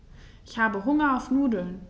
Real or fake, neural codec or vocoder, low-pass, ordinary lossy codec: real; none; none; none